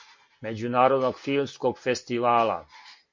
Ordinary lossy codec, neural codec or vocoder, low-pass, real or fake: MP3, 48 kbps; none; 7.2 kHz; real